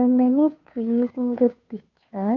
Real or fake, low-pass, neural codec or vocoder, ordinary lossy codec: fake; 7.2 kHz; codec, 16 kHz, 2 kbps, FunCodec, trained on Chinese and English, 25 frames a second; none